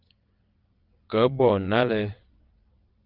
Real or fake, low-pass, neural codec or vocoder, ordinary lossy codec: fake; 5.4 kHz; vocoder, 22.05 kHz, 80 mel bands, WaveNeXt; Opus, 32 kbps